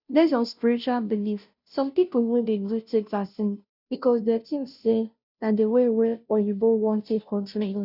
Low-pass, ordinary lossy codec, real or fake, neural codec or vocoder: 5.4 kHz; none; fake; codec, 16 kHz, 0.5 kbps, FunCodec, trained on Chinese and English, 25 frames a second